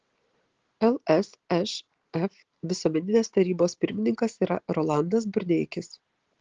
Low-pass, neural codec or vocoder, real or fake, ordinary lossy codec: 7.2 kHz; none; real; Opus, 16 kbps